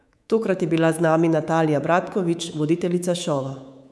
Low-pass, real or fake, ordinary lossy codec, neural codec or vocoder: none; fake; none; codec, 24 kHz, 3.1 kbps, DualCodec